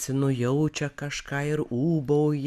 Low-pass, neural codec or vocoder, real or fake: 14.4 kHz; none; real